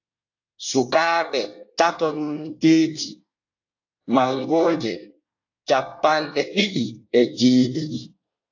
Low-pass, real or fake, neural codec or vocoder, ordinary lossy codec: 7.2 kHz; fake; codec, 24 kHz, 1 kbps, SNAC; AAC, 48 kbps